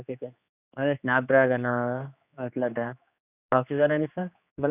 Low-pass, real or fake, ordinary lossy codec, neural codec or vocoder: 3.6 kHz; fake; none; codec, 16 kHz, 2 kbps, X-Codec, HuBERT features, trained on general audio